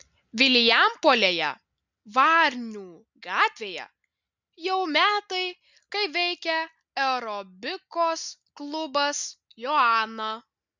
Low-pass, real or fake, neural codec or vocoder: 7.2 kHz; real; none